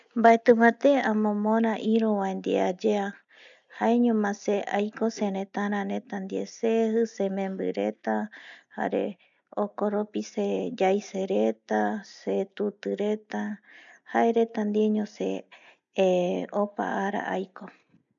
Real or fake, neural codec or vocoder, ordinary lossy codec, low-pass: real; none; MP3, 96 kbps; 7.2 kHz